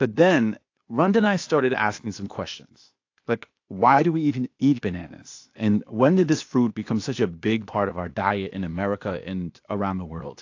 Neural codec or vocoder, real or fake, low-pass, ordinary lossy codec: codec, 16 kHz, 0.8 kbps, ZipCodec; fake; 7.2 kHz; AAC, 48 kbps